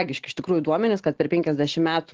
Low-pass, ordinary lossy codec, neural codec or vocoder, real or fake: 7.2 kHz; Opus, 16 kbps; none; real